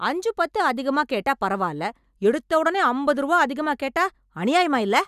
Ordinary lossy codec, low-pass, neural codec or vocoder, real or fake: none; 14.4 kHz; none; real